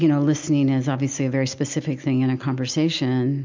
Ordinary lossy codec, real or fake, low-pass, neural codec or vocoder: MP3, 64 kbps; real; 7.2 kHz; none